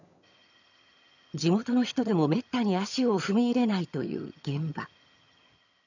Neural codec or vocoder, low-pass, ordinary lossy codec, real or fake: vocoder, 22.05 kHz, 80 mel bands, HiFi-GAN; 7.2 kHz; none; fake